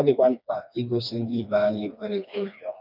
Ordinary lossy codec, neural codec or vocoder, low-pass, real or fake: none; codec, 16 kHz, 2 kbps, FreqCodec, smaller model; 5.4 kHz; fake